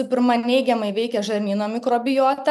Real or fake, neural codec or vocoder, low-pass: real; none; 14.4 kHz